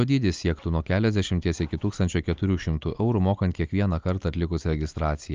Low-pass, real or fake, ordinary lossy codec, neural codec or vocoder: 7.2 kHz; real; Opus, 24 kbps; none